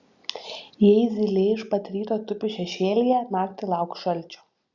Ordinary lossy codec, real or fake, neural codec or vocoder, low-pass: Opus, 64 kbps; real; none; 7.2 kHz